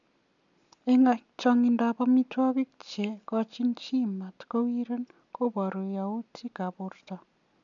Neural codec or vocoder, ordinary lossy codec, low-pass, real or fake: none; none; 7.2 kHz; real